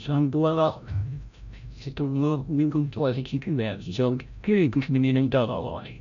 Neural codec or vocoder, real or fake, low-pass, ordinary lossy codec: codec, 16 kHz, 0.5 kbps, FreqCodec, larger model; fake; 7.2 kHz; none